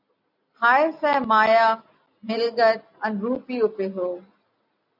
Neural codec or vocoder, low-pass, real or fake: none; 5.4 kHz; real